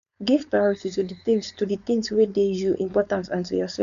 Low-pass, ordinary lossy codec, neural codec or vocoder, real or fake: 7.2 kHz; none; codec, 16 kHz, 4.8 kbps, FACodec; fake